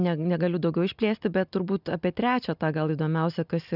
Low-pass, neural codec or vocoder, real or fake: 5.4 kHz; none; real